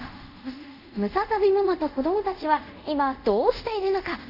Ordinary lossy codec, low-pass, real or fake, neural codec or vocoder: none; 5.4 kHz; fake; codec, 24 kHz, 0.5 kbps, DualCodec